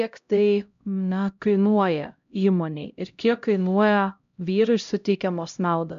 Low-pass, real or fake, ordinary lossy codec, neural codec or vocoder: 7.2 kHz; fake; MP3, 64 kbps; codec, 16 kHz, 0.5 kbps, X-Codec, HuBERT features, trained on LibriSpeech